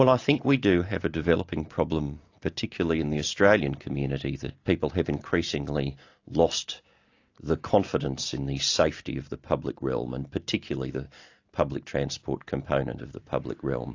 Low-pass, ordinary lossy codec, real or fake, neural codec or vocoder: 7.2 kHz; AAC, 48 kbps; real; none